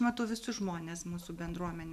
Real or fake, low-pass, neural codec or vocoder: real; 14.4 kHz; none